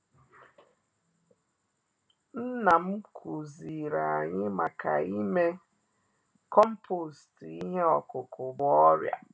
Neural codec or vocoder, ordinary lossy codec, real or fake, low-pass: none; none; real; none